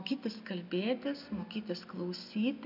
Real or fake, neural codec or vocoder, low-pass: real; none; 5.4 kHz